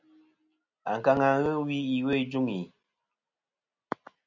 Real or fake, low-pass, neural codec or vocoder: real; 7.2 kHz; none